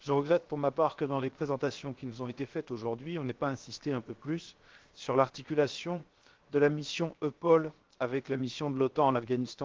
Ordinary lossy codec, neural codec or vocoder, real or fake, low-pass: Opus, 16 kbps; codec, 16 kHz, 0.7 kbps, FocalCodec; fake; 7.2 kHz